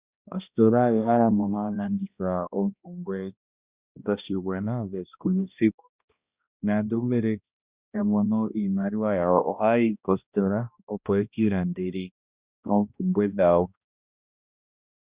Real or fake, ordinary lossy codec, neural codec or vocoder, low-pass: fake; Opus, 64 kbps; codec, 16 kHz, 1 kbps, X-Codec, HuBERT features, trained on balanced general audio; 3.6 kHz